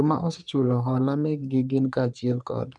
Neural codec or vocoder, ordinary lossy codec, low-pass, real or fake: codec, 44.1 kHz, 3.4 kbps, Pupu-Codec; none; 10.8 kHz; fake